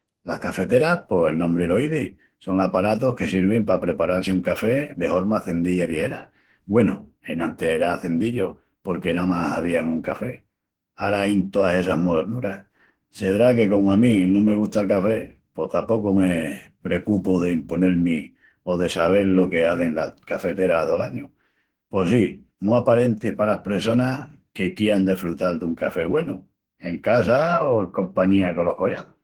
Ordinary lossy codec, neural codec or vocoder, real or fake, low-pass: Opus, 16 kbps; autoencoder, 48 kHz, 32 numbers a frame, DAC-VAE, trained on Japanese speech; fake; 19.8 kHz